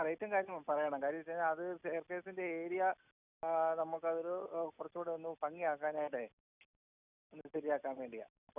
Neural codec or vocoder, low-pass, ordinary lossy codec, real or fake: none; 3.6 kHz; none; real